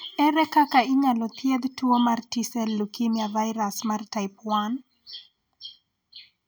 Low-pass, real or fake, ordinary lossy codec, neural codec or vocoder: none; real; none; none